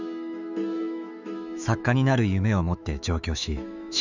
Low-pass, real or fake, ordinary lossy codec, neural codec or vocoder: 7.2 kHz; fake; none; autoencoder, 48 kHz, 128 numbers a frame, DAC-VAE, trained on Japanese speech